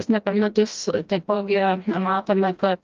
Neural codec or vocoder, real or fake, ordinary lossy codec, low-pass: codec, 16 kHz, 1 kbps, FreqCodec, smaller model; fake; Opus, 32 kbps; 7.2 kHz